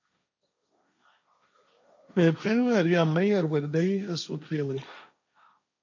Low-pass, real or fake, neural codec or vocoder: 7.2 kHz; fake; codec, 16 kHz, 1.1 kbps, Voila-Tokenizer